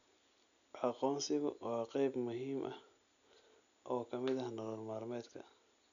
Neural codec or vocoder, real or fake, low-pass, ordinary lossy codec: none; real; 7.2 kHz; none